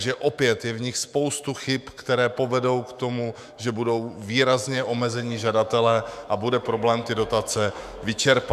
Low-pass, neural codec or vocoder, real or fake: 14.4 kHz; autoencoder, 48 kHz, 128 numbers a frame, DAC-VAE, trained on Japanese speech; fake